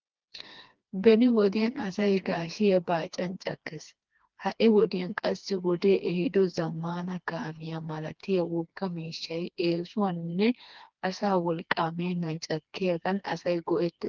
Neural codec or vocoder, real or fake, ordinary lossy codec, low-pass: codec, 16 kHz, 2 kbps, FreqCodec, smaller model; fake; Opus, 32 kbps; 7.2 kHz